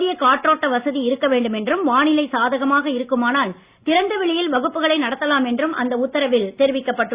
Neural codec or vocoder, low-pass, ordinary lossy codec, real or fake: none; 3.6 kHz; Opus, 64 kbps; real